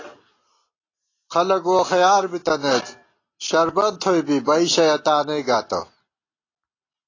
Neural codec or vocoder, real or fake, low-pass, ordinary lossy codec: none; real; 7.2 kHz; AAC, 32 kbps